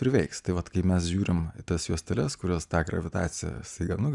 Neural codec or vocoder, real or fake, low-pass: none; real; 10.8 kHz